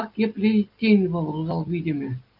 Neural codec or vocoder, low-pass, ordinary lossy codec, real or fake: none; 5.4 kHz; Opus, 24 kbps; real